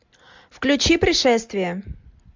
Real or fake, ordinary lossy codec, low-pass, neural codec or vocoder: real; MP3, 64 kbps; 7.2 kHz; none